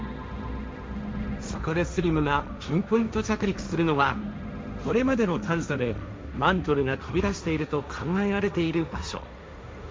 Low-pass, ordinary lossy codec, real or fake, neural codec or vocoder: none; none; fake; codec, 16 kHz, 1.1 kbps, Voila-Tokenizer